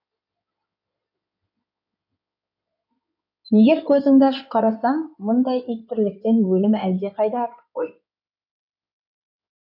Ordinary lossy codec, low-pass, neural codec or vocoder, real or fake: none; 5.4 kHz; codec, 16 kHz in and 24 kHz out, 2.2 kbps, FireRedTTS-2 codec; fake